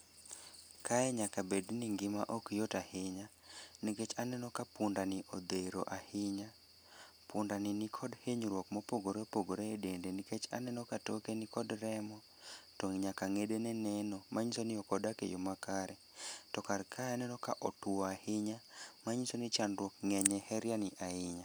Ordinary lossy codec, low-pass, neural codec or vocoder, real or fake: none; none; none; real